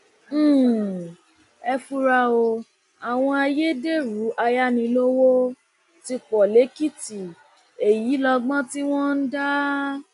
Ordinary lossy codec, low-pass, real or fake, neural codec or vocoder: none; 10.8 kHz; real; none